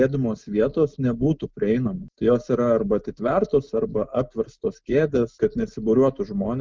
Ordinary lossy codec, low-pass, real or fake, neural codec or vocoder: Opus, 32 kbps; 7.2 kHz; real; none